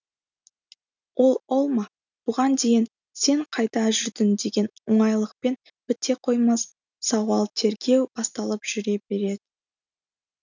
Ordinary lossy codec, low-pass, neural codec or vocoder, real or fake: none; 7.2 kHz; none; real